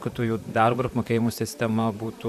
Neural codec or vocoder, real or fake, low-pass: vocoder, 44.1 kHz, 128 mel bands, Pupu-Vocoder; fake; 14.4 kHz